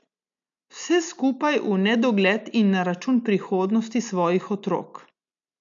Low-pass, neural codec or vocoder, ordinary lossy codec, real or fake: 7.2 kHz; none; MP3, 64 kbps; real